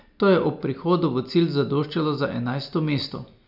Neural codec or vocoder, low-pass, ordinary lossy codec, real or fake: none; 5.4 kHz; none; real